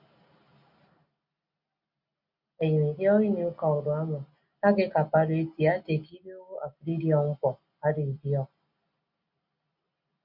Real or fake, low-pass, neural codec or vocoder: real; 5.4 kHz; none